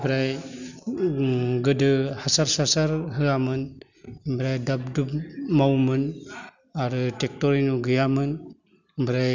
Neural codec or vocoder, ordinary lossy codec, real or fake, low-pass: none; none; real; 7.2 kHz